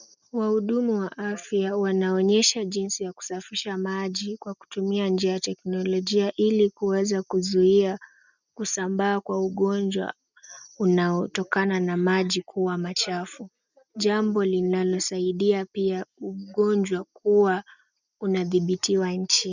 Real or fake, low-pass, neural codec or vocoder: real; 7.2 kHz; none